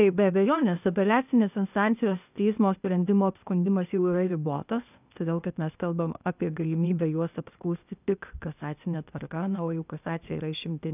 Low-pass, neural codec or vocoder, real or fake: 3.6 kHz; codec, 16 kHz, 0.8 kbps, ZipCodec; fake